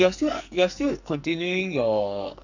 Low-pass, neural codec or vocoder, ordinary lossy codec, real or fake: 7.2 kHz; codec, 44.1 kHz, 2.6 kbps, SNAC; none; fake